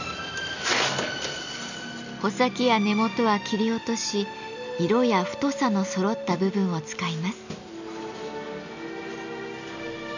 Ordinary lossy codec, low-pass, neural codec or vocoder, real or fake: none; 7.2 kHz; none; real